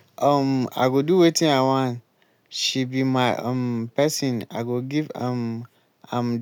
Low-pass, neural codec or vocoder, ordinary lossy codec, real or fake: 19.8 kHz; none; none; real